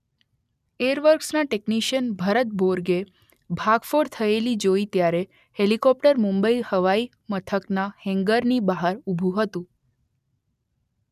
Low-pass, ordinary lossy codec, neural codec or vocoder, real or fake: 14.4 kHz; none; none; real